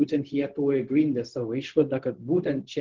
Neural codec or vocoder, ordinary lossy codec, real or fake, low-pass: codec, 16 kHz, 0.4 kbps, LongCat-Audio-Codec; Opus, 16 kbps; fake; 7.2 kHz